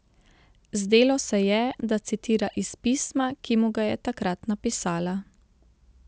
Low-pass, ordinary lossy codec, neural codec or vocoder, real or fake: none; none; none; real